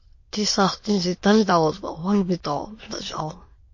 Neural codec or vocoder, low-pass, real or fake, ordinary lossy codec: autoencoder, 22.05 kHz, a latent of 192 numbers a frame, VITS, trained on many speakers; 7.2 kHz; fake; MP3, 32 kbps